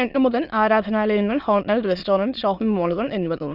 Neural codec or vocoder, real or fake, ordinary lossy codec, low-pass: autoencoder, 22.05 kHz, a latent of 192 numbers a frame, VITS, trained on many speakers; fake; none; 5.4 kHz